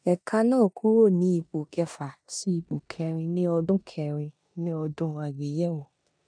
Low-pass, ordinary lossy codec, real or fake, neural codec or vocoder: 9.9 kHz; none; fake; codec, 16 kHz in and 24 kHz out, 0.9 kbps, LongCat-Audio-Codec, four codebook decoder